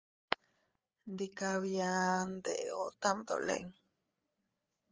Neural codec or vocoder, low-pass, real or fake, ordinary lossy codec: codec, 16 kHz, 8 kbps, FreqCodec, larger model; 7.2 kHz; fake; Opus, 24 kbps